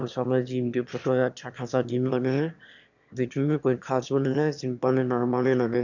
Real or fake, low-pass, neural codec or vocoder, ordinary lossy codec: fake; 7.2 kHz; autoencoder, 22.05 kHz, a latent of 192 numbers a frame, VITS, trained on one speaker; none